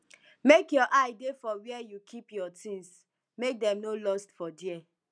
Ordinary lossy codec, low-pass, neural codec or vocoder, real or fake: none; 9.9 kHz; none; real